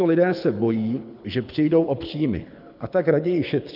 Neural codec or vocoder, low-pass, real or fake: codec, 24 kHz, 6 kbps, HILCodec; 5.4 kHz; fake